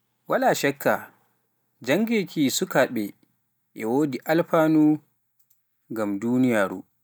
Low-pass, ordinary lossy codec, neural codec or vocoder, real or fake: none; none; none; real